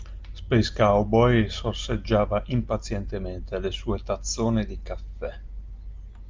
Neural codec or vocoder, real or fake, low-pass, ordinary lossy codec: none; real; 7.2 kHz; Opus, 24 kbps